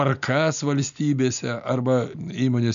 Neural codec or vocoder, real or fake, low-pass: none; real; 7.2 kHz